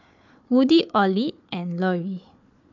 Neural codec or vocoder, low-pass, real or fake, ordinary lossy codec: codec, 16 kHz, 4 kbps, FunCodec, trained on Chinese and English, 50 frames a second; 7.2 kHz; fake; MP3, 64 kbps